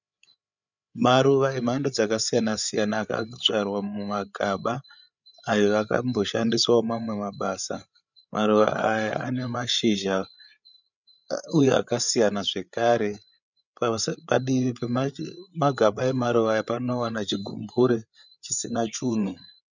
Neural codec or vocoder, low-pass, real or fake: codec, 16 kHz, 8 kbps, FreqCodec, larger model; 7.2 kHz; fake